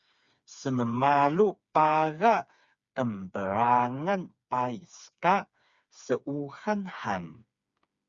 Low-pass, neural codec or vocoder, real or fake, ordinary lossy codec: 7.2 kHz; codec, 16 kHz, 4 kbps, FreqCodec, smaller model; fake; Opus, 64 kbps